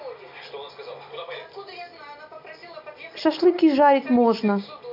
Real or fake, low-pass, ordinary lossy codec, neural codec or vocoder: real; 5.4 kHz; none; none